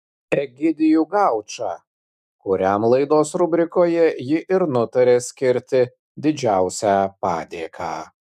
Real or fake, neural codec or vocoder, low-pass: fake; autoencoder, 48 kHz, 128 numbers a frame, DAC-VAE, trained on Japanese speech; 14.4 kHz